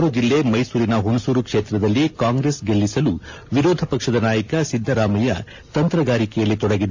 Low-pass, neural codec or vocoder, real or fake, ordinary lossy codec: 7.2 kHz; none; real; AAC, 48 kbps